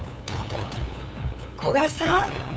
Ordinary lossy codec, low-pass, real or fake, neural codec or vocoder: none; none; fake; codec, 16 kHz, 8 kbps, FunCodec, trained on LibriTTS, 25 frames a second